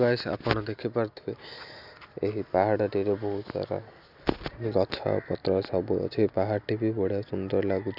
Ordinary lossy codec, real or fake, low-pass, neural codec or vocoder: none; real; 5.4 kHz; none